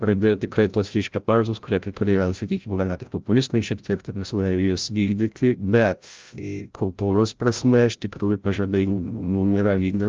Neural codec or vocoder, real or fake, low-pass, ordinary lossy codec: codec, 16 kHz, 0.5 kbps, FreqCodec, larger model; fake; 7.2 kHz; Opus, 24 kbps